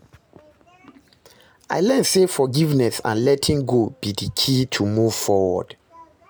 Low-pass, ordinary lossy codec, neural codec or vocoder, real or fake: none; none; none; real